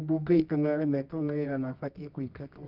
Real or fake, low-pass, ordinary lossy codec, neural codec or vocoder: fake; 5.4 kHz; Opus, 32 kbps; codec, 24 kHz, 0.9 kbps, WavTokenizer, medium music audio release